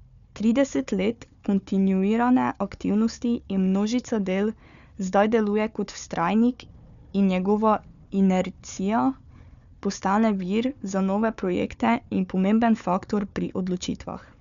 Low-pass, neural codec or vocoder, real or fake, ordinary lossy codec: 7.2 kHz; codec, 16 kHz, 4 kbps, FunCodec, trained on Chinese and English, 50 frames a second; fake; none